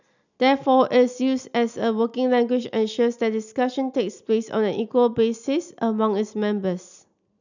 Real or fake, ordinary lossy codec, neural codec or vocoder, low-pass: real; none; none; 7.2 kHz